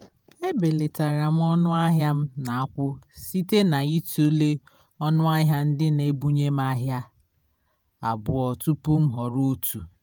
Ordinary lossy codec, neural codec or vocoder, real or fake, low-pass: none; vocoder, 48 kHz, 128 mel bands, Vocos; fake; none